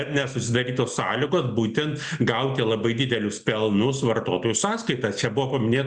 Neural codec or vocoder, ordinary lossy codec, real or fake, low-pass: none; Opus, 64 kbps; real; 10.8 kHz